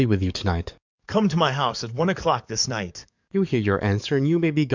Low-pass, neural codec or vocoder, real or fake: 7.2 kHz; codec, 44.1 kHz, 7.8 kbps, DAC; fake